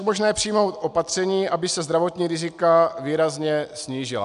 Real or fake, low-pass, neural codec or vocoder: real; 10.8 kHz; none